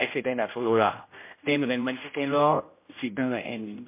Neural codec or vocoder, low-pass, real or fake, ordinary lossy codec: codec, 16 kHz, 0.5 kbps, X-Codec, HuBERT features, trained on general audio; 3.6 kHz; fake; MP3, 24 kbps